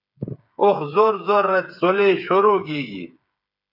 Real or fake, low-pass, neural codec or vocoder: fake; 5.4 kHz; codec, 16 kHz, 8 kbps, FreqCodec, smaller model